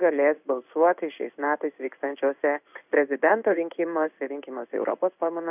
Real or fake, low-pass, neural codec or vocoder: fake; 3.6 kHz; codec, 16 kHz in and 24 kHz out, 1 kbps, XY-Tokenizer